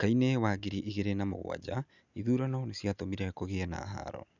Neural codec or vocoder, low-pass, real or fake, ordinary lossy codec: none; 7.2 kHz; real; none